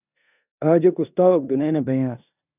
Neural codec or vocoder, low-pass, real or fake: codec, 16 kHz in and 24 kHz out, 0.9 kbps, LongCat-Audio-Codec, four codebook decoder; 3.6 kHz; fake